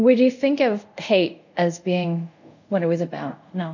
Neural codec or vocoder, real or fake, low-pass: codec, 24 kHz, 0.5 kbps, DualCodec; fake; 7.2 kHz